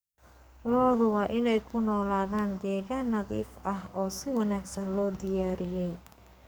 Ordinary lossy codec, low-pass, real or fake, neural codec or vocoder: none; none; fake; codec, 44.1 kHz, 2.6 kbps, SNAC